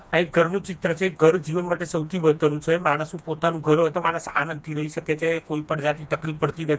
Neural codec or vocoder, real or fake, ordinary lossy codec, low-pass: codec, 16 kHz, 2 kbps, FreqCodec, smaller model; fake; none; none